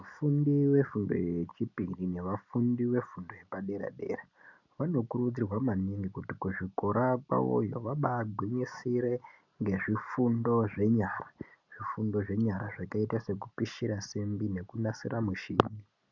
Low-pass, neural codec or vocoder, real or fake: 7.2 kHz; none; real